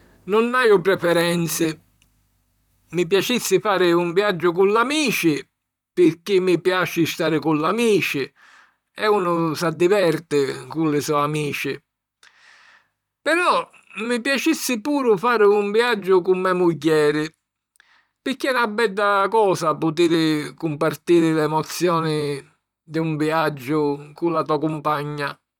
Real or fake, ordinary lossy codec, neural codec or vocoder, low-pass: fake; none; vocoder, 44.1 kHz, 128 mel bands, Pupu-Vocoder; 19.8 kHz